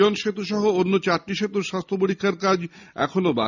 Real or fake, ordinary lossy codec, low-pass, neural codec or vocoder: real; none; 7.2 kHz; none